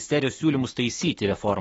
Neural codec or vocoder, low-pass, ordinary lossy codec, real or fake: autoencoder, 48 kHz, 128 numbers a frame, DAC-VAE, trained on Japanese speech; 19.8 kHz; AAC, 24 kbps; fake